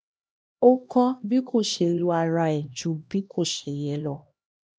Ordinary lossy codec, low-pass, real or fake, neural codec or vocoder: none; none; fake; codec, 16 kHz, 1 kbps, X-Codec, HuBERT features, trained on LibriSpeech